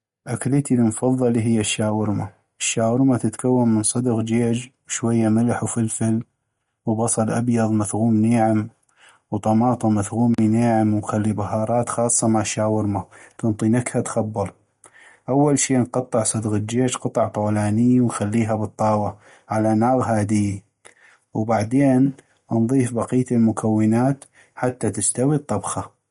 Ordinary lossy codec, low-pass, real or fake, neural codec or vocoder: MP3, 48 kbps; 19.8 kHz; real; none